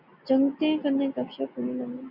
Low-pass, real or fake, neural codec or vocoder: 5.4 kHz; real; none